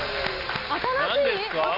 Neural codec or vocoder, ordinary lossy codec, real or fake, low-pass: none; none; real; 5.4 kHz